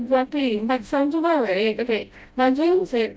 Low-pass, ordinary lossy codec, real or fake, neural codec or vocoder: none; none; fake; codec, 16 kHz, 0.5 kbps, FreqCodec, smaller model